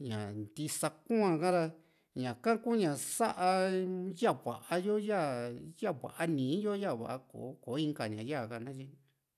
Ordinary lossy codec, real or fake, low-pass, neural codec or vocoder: none; real; 14.4 kHz; none